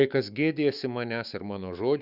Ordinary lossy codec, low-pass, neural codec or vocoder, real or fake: Opus, 64 kbps; 5.4 kHz; autoencoder, 48 kHz, 128 numbers a frame, DAC-VAE, trained on Japanese speech; fake